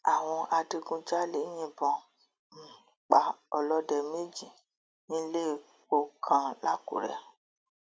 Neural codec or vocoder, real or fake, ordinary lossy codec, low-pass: none; real; none; none